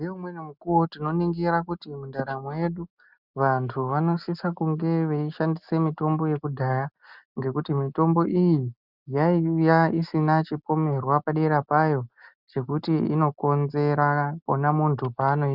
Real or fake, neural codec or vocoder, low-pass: real; none; 5.4 kHz